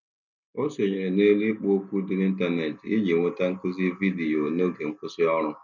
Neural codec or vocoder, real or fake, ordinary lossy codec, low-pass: none; real; none; 7.2 kHz